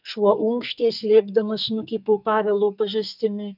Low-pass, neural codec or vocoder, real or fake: 5.4 kHz; codec, 44.1 kHz, 2.6 kbps, SNAC; fake